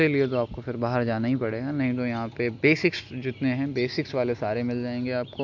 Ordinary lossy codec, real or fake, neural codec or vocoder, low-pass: MP3, 64 kbps; real; none; 7.2 kHz